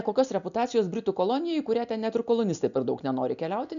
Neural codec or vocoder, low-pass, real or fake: none; 7.2 kHz; real